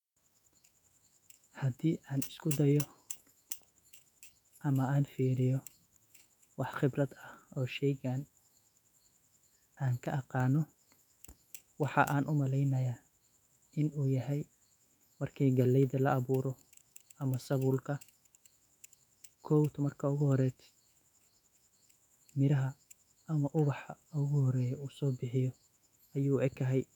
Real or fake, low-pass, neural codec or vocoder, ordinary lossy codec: fake; 19.8 kHz; autoencoder, 48 kHz, 128 numbers a frame, DAC-VAE, trained on Japanese speech; none